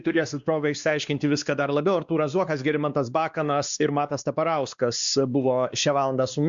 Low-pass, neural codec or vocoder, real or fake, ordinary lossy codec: 7.2 kHz; codec, 16 kHz, 2 kbps, X-Codec, WavLM features, trained on Multilingual LibriSpeech; fake; Opus, 64 kbps